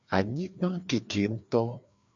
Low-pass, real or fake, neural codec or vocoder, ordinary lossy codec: 7.2 kHz; fake; codec, 16 kHz, 2 kbps, FreqCodec, larger model; AAC, 64 kbps